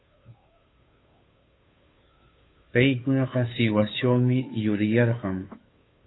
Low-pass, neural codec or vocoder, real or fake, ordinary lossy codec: 7.2 kHz; codec, 16 kHz, 2 kbps, FunCodec, trained on Chinese and English, 25 frames a second; fake; AAC, 16 kbps